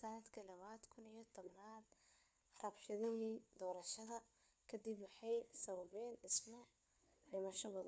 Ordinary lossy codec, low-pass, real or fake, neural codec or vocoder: none; none; fake; codec, 16 kHz, 4 kbps, FunCodec, trained on LibriTTS, 50 frames a second